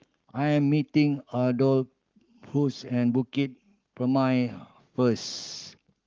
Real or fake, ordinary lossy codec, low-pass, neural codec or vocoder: fake; Opus, 24 kbps; 7.2 kHz; codec, 44.1 kHz, 7.8 kbps, Pupu-Codec